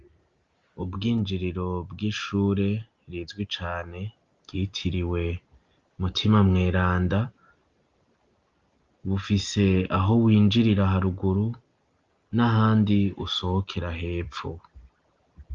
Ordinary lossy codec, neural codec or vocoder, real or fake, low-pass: Opus, 32 kbps; none; real; 7.2 kHz